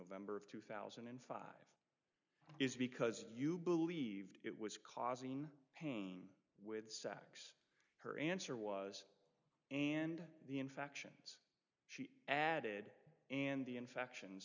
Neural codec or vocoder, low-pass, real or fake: none; 7.2 kHz; real